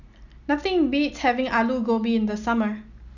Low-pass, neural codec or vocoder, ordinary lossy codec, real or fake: 7.2 kHz; none; none; real